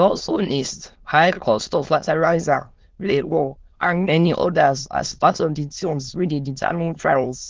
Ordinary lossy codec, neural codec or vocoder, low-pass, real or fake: Opus, 16 kbps; autoencoder, 22.05 kHz, a latent of 192 numbers a frame, VITS, trained on many speakers; 7.2 kHz; fake